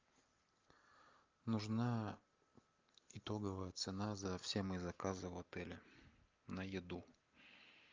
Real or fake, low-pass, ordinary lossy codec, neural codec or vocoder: real; 7.2 kHz; Opus, 24 kbps; none